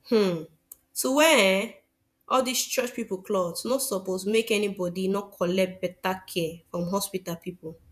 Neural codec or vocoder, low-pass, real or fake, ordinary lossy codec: vocoder, 48 kHz, 128 mel bands, Vocos; 14.4 kHz; fake; none